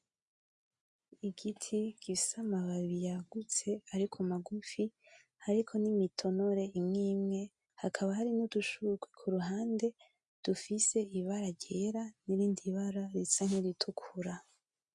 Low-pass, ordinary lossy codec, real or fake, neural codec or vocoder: 10.8 kHz; MP3, 64 kbps; real; none